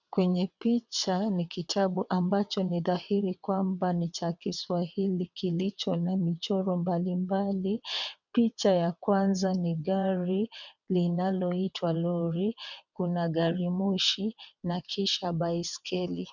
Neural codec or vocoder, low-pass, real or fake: vocoder, 22.05 kHz, 80 mel bands, WaveNeXt; 7.2 kHz; fake